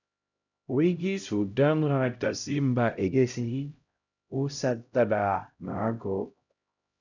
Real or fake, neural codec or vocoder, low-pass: fake; codec, 16 kHz, 0.5 kbps, X-Codec, HuBERT features, trained on LibriSpeech; 7.2 kHz